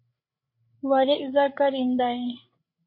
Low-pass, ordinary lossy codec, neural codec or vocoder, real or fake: 5.4 kHz; MP3, 32 kbps; codec, 16 kHz, 8 kbps, FreqCodec, larger model; fake